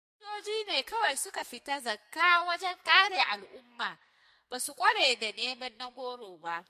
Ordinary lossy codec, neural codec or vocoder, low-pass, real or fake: MP3, 64 kbps; codec, 44.1 kHz, 2.6 kbps, SNAC; 14.4 kHz; fake